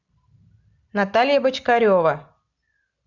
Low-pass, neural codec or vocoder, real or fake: 7.2 kHz; none; real